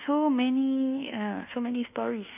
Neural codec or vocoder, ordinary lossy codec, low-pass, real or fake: codec, 24 kHz, 1.2 kbps, DualCodec; none; 3.6 kHz; fake